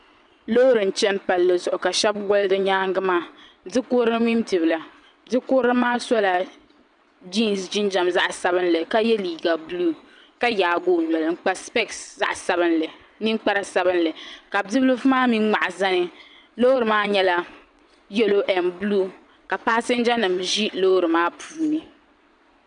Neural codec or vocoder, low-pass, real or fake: vocoder, 22.05 kHz, 80 mel bands, WaveNeXt; 9.9 kHz; fake